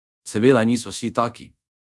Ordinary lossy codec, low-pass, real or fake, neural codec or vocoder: none; none; fake; codec, 24 kHz, 0.5 kbps, DualCodec